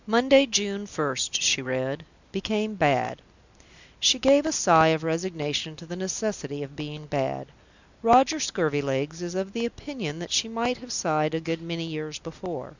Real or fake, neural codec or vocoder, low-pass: real; none; 7.2 kHz